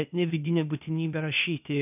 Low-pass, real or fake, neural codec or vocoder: 3.6 kHz; fake; codec, 16 kHz, 0.8 kbps, ZipCodec